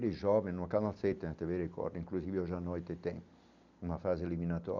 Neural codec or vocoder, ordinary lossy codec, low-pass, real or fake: none; none; 7.2 kHz; real